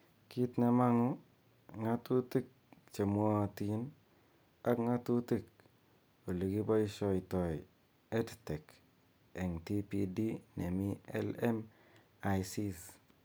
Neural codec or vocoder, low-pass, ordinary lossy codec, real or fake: none; none; none; real